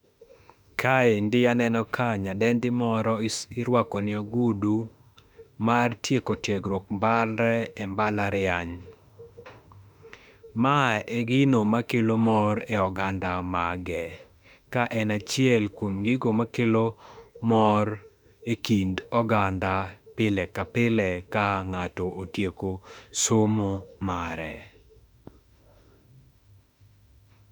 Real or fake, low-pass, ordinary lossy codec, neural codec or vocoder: fake; 19.8 kHz; none; autoencoder, 48 kHz, 32 numbers a frame, DAC-VAE, trained on Japanese speech